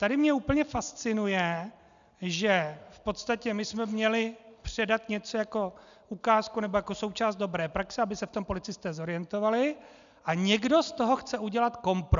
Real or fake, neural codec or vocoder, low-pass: real; none; 7.2 kHz